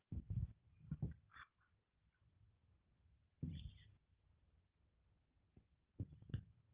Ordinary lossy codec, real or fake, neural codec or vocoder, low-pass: Opus, 32 kbps; real; none; 3.6 kHz